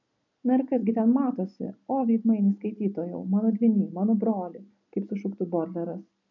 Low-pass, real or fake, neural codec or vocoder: 7.2 kHz; real; none